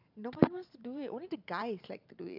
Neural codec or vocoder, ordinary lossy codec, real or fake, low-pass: vocoder, 44.1 kHz, 128 mel bands every 512 samples, BigVGAN v2; AAC, 48 kbps; fake; 5.4 kHz